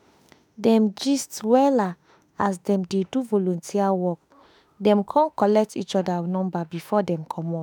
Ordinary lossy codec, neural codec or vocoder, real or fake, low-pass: none; autoencoder, 48 kHz, 32 numbers a frame, DAC-VAE, trained on Japanese speech; fake; none